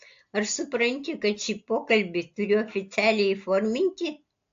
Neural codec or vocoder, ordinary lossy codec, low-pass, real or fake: none; AAC, 48 kbps; 7.2 kHz; real